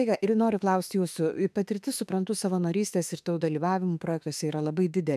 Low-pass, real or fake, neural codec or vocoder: 14.4 kHz; fake; autoencoder, 48 kHz, 32 numbers a frame, DAC-VAE, trained on Japanese speech